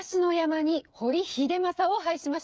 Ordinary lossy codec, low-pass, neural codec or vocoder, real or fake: none; none; codec, 16 kHz, 8 kbps, FreqCodec, smaller model; fake